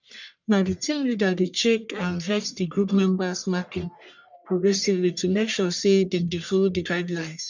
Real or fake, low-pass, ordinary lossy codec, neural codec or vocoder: fake; 7.2 kHz; none; codec, 44.1 kHz, 1.7 kbps, Pupu-Codec